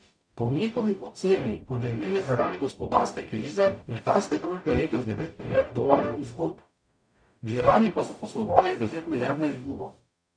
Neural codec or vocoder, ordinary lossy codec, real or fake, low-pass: codec, 44.1 kHz, 0.9 kbps, DAC; MP3, 96 kbps; fake; 9.9 kHz